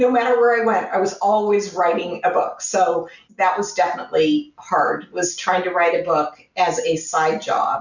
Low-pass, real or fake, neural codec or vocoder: 7.2 kHz; real; none